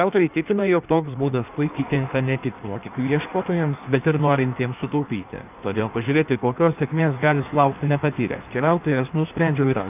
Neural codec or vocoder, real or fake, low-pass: codec, 16 kHz in and 24 kHz out, 1.1 kbps, FireRedTTS-2 codec; fake; 3.6 kHz